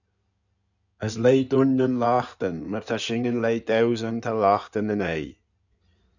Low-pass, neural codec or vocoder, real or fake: 7.2 kHz; codec, 16 kHz in and 24 kHz out, 2.2 kbps, FireRedTTS-2 codec; fake